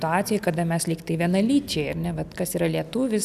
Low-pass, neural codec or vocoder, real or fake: 14.4 kHz; none; real